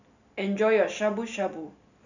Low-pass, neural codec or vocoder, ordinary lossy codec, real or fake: 7.2 kHz; none; none; real